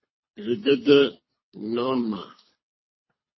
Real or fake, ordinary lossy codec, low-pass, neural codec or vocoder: fake; MP3, 24 kbps; 7.2 kHz; codec, 24 kHz, 3 kbps, HILCodec